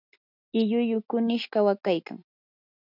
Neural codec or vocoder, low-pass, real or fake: none; 5.4 kHz; real